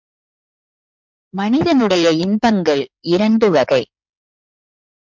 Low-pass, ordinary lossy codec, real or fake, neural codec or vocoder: 7.2 kHz; MP3, 64 kbps; fake; codec, 16 kHz in and 24 kHz out, 2.2 kbps, FireRedTTS-2 codec